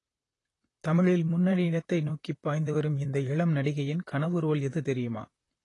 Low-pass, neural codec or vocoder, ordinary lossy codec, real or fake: 10.8 kHz; vocoder, 44.1 kHz, 128 mel bands, Pupu-Vocoder; AAC, 32 kbps; fake